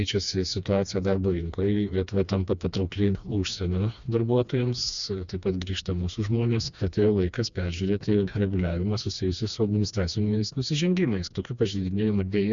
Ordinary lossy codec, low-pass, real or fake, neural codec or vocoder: Opus, 64 kbps; 7.2 kHz; fake; codec, 16 kHz, 2 kbps, FreqCodec, smaller model